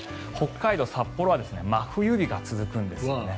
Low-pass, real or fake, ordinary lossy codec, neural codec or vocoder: none; real; none; none